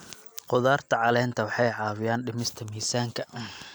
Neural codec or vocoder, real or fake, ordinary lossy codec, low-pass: none; real; none; none